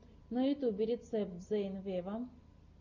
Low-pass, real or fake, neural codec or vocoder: 7.2 kHz; real; none